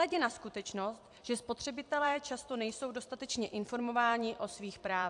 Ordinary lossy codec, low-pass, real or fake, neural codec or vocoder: AAC, 64 kbps; 10.8 kHz; fake; vocoder, 44.1 kHz, 128 mel bands every 256 samples, BigVGAN v2